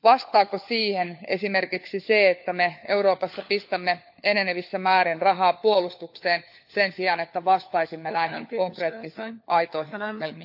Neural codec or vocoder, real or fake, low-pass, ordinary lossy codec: codec, 16 kHz, 4 kbps, FunCodec, trained on LibriTTS, 50 frames a second; fake; 5.4 kHz; AAC, 48 kbps